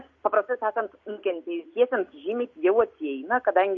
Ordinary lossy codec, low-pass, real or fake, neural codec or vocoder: MP3, 48 kbps; 7.2 kHz; real; none